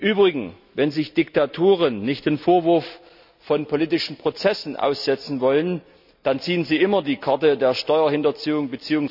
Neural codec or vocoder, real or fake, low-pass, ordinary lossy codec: none; real; 5.4 kHz; none